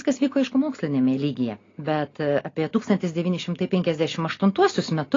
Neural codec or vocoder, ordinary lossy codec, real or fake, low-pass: none; AAC, 32 kbps; real; 7.2 kHz